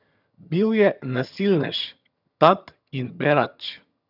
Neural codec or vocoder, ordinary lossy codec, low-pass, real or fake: vocoder, 22.05 kHz, 80 mel bands, HiFi-GAN; none; 5.4 kHz; fake